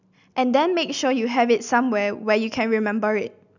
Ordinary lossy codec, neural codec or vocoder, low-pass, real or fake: none; none; 7.2 kHz; real